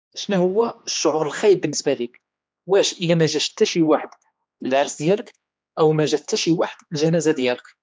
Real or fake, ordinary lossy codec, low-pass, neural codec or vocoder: fake; none; none; codec, 16 kHz, 2 kbps, X-Codec, HuBERT features, trained on general audio